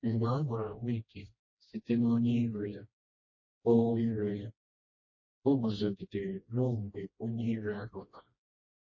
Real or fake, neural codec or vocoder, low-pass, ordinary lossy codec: fake; codec, 16 kHz, 1 kbps, FreqCodec, smaller model; 7.2 kHz; MP3, 32 kbps